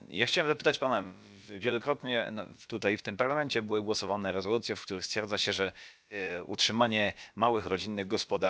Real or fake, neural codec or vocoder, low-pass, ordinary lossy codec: fake; codec, 16 kHz, about 1 kbps, DyCAST, with the encoder's durations; none; none